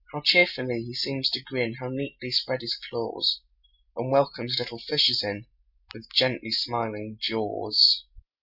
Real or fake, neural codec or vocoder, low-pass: real; none; 5.4 kHz